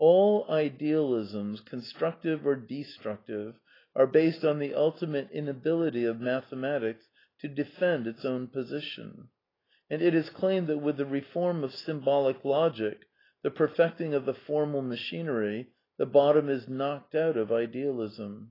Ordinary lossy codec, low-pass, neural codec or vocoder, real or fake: AAC, 24 kbps; 5.4 kHz; none; real